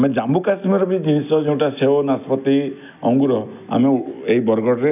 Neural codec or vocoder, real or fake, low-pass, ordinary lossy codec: none; real; 3.6 kHz; none